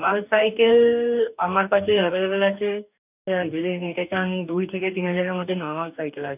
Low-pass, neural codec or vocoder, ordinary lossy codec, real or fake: 3.6 kHz; codec, 44.1 kHz, 2.6 kbps, DAC; none; fake